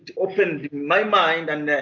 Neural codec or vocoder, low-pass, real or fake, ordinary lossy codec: none; 7.2 kHz; real; MP3, 48 kbps